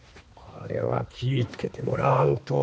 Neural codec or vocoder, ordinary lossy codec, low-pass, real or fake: codec, 16 kHz, 2 kbps, X-Codec, HuBERT features, trained on balanced general audio; none; none; fake